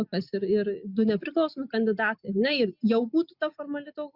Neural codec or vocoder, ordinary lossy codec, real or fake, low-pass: none; Opus, 64 kbps; real; 5.4 kHz